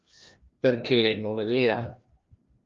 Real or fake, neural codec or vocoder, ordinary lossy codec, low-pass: fake; codec, 16 kHz, 1 kbps, FreqCodec, larger model; Opus, 32 kbps; 7.2 kHz